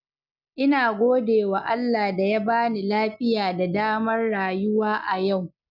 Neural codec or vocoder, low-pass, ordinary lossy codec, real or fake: none; 5.4 kHz; none; real